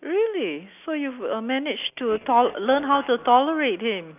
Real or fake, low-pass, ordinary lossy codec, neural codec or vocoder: real; 3.6 kHz; none; none